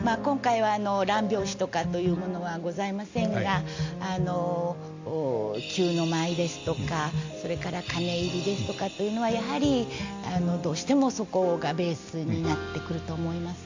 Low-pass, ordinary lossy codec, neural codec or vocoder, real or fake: 7.2 kHz; AAC, 48 kbps; none; real